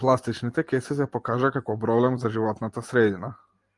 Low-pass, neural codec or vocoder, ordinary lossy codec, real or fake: 10.8 kHz; none; Opus, 32 kbps; real